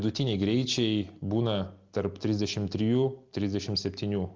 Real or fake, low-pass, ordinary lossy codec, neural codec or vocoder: real; 7.2 kHz; Opus, 32 kbps; none